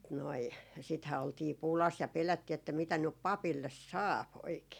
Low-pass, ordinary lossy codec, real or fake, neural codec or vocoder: 19.8 kHz; none; real; none